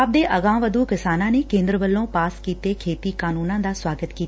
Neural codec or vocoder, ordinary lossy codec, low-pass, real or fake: none; none; none; real